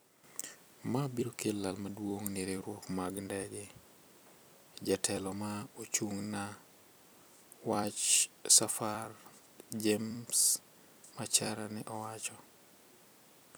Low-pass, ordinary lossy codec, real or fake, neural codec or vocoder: none; none; fake; vocoder, 44.1 kHz, 128 mel bands every 256 samples, BigVGAN v2